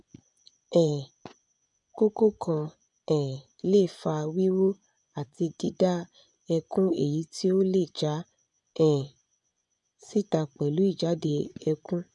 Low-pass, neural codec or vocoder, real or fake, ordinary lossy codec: 10.8 kHz; vocoder, 24 kHz, 100 mel bands, Vocos; fake; none